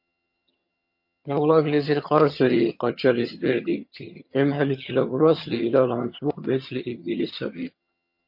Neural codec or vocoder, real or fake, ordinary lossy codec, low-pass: vocoder, 22.05 kHz, 80 mel bands, HiFi-GAN; fake; MP3, 32 kbps; 5.4 kHz